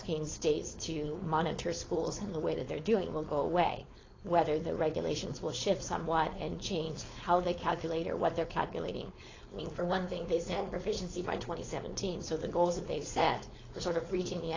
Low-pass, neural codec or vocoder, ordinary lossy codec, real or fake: 7.2 kHz; codec, 16 kHz, 4.8 kbps, FACodec; AAC, 32 kbps; fake